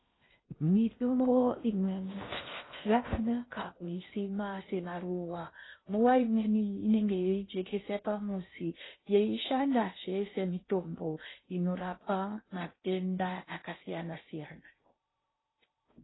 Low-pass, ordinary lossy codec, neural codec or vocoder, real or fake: 7.2 kHz; AAC, 16 kbps; codec, 16 kHz in and 24 kHz out, 0.6 kbps, FocalCodec, streaming, 4096 codes; fake